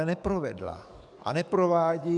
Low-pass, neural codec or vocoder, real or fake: 10.8 kHz; codec, 44.1 kHz, 7.8 kbps, DAC; fake